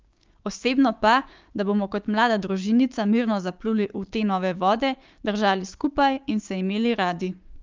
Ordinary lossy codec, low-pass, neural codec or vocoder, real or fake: Opus, 24 kbps; 7.2 kHz; codec, 16 kHz, 6 kbps, DAC; fake